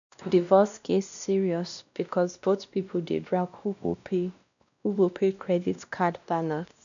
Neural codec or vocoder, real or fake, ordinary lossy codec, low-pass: codec, 16 kHz, 1 kbps, X-Codec, WavLM features, trained on Multilingual LibriSpeech; fake; none; 7.2 kHz